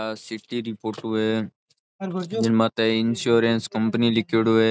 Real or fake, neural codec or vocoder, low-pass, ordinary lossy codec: real; none; none; none